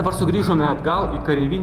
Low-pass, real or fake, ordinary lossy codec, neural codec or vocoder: 14.4 kHz; fake; Opus, 32 kbps; vocoder, 48 kHz, 128 mel bands, Vocos